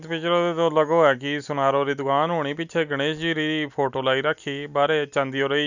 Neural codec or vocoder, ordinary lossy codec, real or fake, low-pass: none; none; real; 7.2 kHz